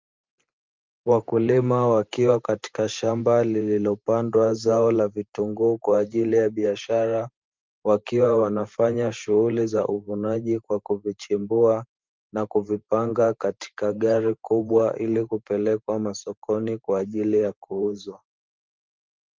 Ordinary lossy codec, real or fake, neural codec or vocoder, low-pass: Opus, 32 kbps; fake; vocoder, 44.1 kHz, 128 mel bands every 512 samples, BigVGAN v2; 7.2 kHz